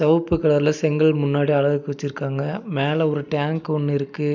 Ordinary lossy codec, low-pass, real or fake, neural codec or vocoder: none; 7.2 kHz; real; none